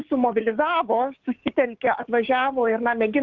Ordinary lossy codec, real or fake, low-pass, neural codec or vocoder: Opus, 16 kbps; fake; 7.2 kHz; codec, 16 kHz, 6 kbps, DAC